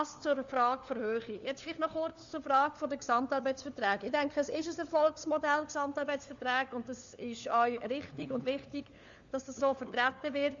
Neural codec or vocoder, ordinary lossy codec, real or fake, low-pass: codec, 16 kHz, 4 kbps, FunCodec, trained on LibriTTS, 50 frames a second; Opus, 64 kbps; fake; 7.2 kHz